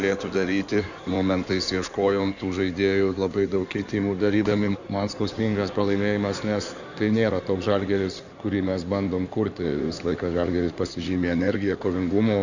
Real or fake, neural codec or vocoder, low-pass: fake; codec, 16 kHz in and 24 kHz out, 2.2 kbps, FireRedTTS-2 codec; 7.2 kHz